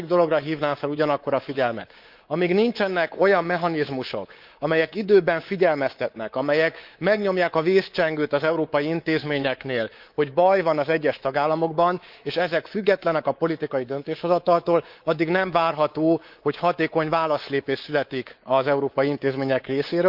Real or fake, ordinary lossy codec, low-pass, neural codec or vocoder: fake; Opus, 32 kbps; 5.4 kHz; codec, 24 kHz, 3.1 kbps, DualCodec